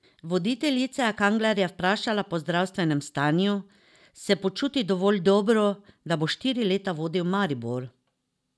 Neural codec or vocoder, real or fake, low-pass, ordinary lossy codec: none; real; none; none